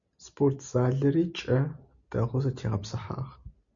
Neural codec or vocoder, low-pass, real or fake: none; 7.2 kHz; real